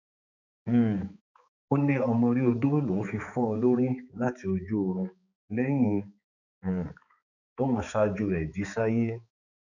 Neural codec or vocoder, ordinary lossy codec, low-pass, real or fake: codec, 16 kHz, 4 kbps, X-Codec, HuBERT features, trained on balanced general audio; none; 7.2 kHz; fake